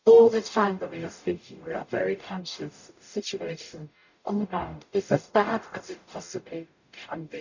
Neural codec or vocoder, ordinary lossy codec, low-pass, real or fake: codec, 44.1 kHz, 0.9 kbps, DAC; none; 7.2 kHz; fake